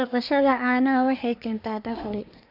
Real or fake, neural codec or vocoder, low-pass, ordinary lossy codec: fake; codec, 24 kHz, 1 kbps, SNAC; 5.4 kHz; none